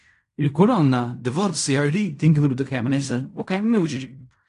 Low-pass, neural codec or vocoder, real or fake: 10.8 kHz; codec, 16 kHz in and 24 kHz out, 0.4 kbps, LongCat-Audio-Codec, fine tuned four codebook decoder; fake